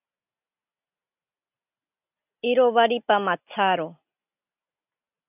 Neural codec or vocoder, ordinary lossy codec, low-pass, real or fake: none; AAC, 32 kbps; 3.6 kHz; real